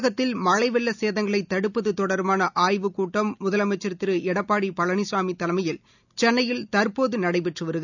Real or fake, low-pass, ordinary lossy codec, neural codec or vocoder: real; 7.2 kHz; none; none